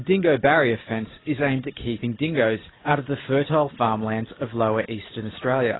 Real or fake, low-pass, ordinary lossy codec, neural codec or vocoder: real; 7.2 kHz; AAC, 16 kbps; none